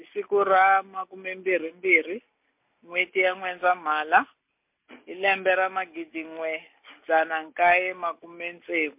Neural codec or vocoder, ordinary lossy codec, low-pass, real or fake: none; MP3, 32 kbps; 3.6 kHz; real